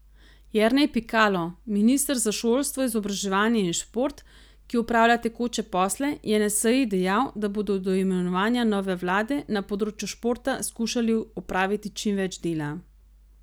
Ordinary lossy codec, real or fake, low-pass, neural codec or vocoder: none; real; none; none